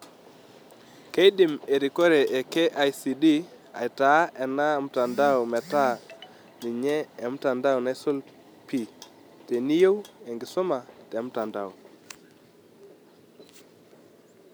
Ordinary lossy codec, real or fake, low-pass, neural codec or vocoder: none; real; none; none